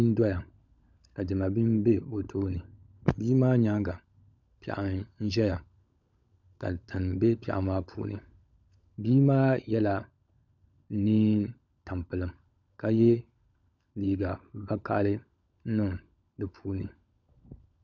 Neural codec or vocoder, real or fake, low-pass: codec, 16 kHz, 16 kbps, FunCodec, trained on LibriTTS, 50 frames a second; fake; 7.2 kHz